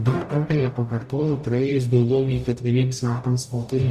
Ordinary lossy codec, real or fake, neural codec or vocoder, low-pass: Opus, 64 kbps; fake; codec, 44.1 kHz, 0.9 kbps, DAC; 14.4 kHz